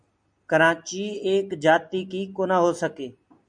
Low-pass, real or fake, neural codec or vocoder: 9.9 kHz; real; none